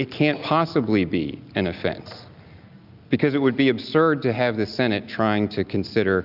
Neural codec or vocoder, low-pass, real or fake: none; 5.4 kHz; real